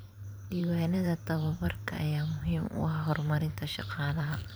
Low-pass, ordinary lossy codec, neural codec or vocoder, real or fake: none; none; vocoder, 44.1 kHz, 128 mel bands every 512 samples, BigVGAN v2; fake